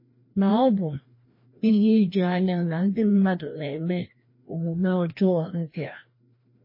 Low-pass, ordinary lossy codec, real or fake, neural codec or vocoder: 5.4 kHz; MP3, 24 kbps; fake; codec, 16 kHz, 1 kbps, FreqCodec, larger model